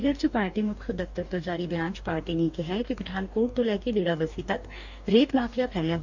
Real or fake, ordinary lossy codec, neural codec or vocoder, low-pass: fake; none; codec, 44.1 kHz, 2.6 kbps, DAC; 7.2 kHz